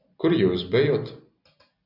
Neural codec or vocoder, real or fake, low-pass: none; real; 5.4 kHz